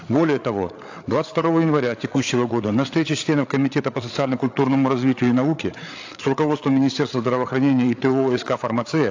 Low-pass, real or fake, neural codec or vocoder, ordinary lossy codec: 7.2 kHz; fake; codec, 16 kHz, 8 kbps, FreqCodec, larger model; AAC, 48 kbps